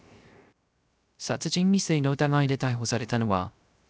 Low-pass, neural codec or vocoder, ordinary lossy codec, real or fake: none; codec, 16 kHz, 0.3 kbps, FocalCodec; none; fake